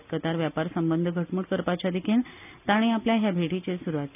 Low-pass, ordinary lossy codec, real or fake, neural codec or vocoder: 3.6 kHz; none; real; none